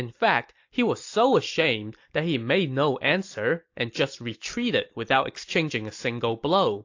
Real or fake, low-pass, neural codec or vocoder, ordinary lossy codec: real; 7.2 kHz; none; AAC, 48 kbps